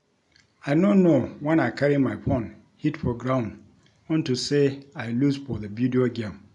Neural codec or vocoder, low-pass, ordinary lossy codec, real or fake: none; 10.8 kHz; none; real